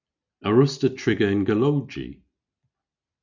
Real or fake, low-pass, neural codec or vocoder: real; 7.2 kHz; none